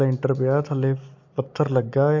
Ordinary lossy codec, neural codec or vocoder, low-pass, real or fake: AAC, 48 kbps; none; 7.2 kHz; real